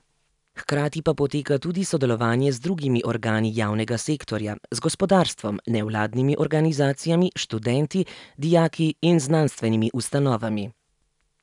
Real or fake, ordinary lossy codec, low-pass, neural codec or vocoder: real; none; 10.8 kHz; none